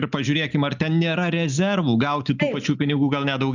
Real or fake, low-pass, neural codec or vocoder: real; 7.2 kHz; none